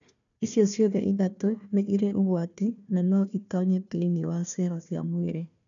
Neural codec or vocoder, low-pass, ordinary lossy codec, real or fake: codec, 16 kHz, 1 kbps, FunCodec, trained on Chinese and English, 50 frames a second; 7.2 kHz; none; fake